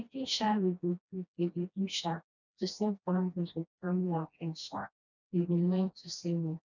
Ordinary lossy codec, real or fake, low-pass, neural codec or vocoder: none; fake; 7.2 kHz; codec, 16 kHz, 1 kbps, FreqCodec, smaller model